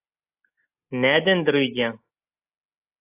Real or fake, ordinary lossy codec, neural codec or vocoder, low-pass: real; AAC, 32 kbps; none; 3.6 kHz